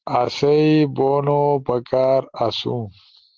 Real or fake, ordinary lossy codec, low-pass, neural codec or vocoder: real; Opus, 16 kbps; 7.2 kHz; none